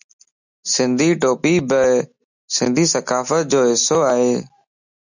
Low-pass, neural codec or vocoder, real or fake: 7.2 kHz; none; real